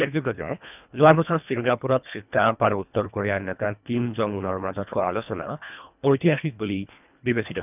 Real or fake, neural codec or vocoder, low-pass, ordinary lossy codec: fake; codec, 24 kHz, 1.5 kbps, HILCodec; 3.6 kHz; none